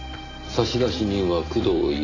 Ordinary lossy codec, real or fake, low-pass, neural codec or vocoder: AAC, 32 kbps; real; 7.2 kHz; none